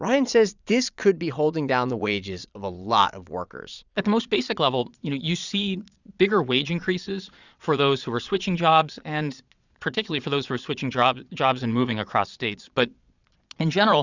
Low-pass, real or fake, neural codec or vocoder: 7.2 kHz; fake; vocoder, 22.05 kHz, 80 mel bands, Vocos